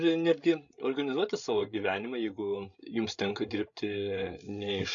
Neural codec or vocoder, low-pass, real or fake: codec, 16 kHz, 16 kbps, FreqCodec, larger model; 7.2 kHz; fake